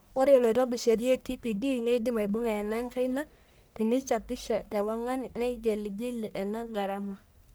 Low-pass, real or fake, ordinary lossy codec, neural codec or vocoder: none; fake; none; codec, 44.1 kHz, 1.7 kbps, Pupu-Codec